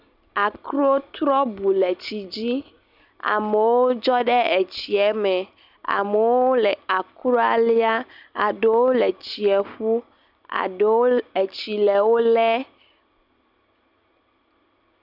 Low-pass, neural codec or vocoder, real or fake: 5.4 kHz; none; real